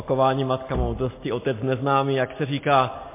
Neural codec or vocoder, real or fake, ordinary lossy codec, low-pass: none; real; MP3, 24 kbps; 3.6 kHz